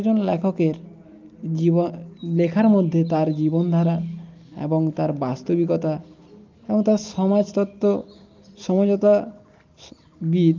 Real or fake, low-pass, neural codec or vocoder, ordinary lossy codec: real; 7.2 kHz; none; Opus, 24 kbps